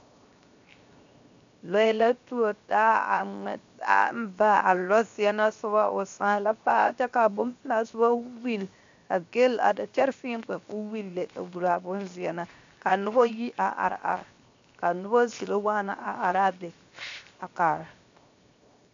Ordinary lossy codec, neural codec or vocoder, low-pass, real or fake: AAC, 64 kbps; codec, 16 kHz, 0.7 kbps, FocalCodec; 7.2 kHz; fake